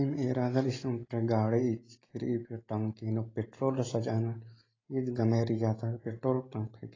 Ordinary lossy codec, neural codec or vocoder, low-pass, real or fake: AAC, 32 kbps; none; 7.2 kHz; real